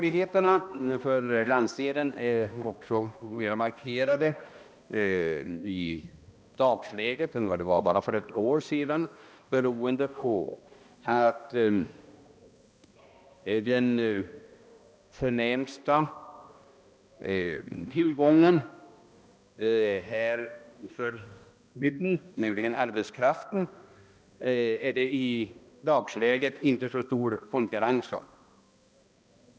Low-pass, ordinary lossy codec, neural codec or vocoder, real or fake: none; none; codec, 16 kHz, 1 kbps, X-Codec, HuBERT features, trained on balanced general audio; fake